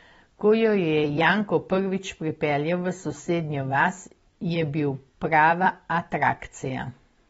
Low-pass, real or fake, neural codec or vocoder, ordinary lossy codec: 19.8 kHz; fake; vocoder, 44.1 kHz, 128 mel bands every 256 samples, BigVGAN v2; AAC, 24 kbps